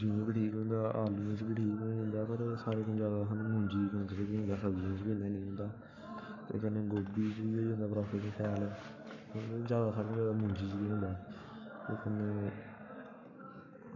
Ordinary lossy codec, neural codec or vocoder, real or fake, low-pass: none; codec, 44.1 kHz, 7.8 kbps, Pupu-Codec; fake; 7.2 kHz